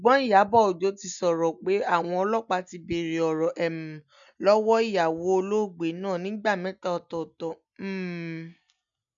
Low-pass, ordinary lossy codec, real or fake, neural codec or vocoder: 7.2 kHz; none; real; none